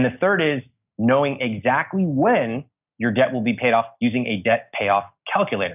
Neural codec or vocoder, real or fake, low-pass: none; real; 3.6 kHz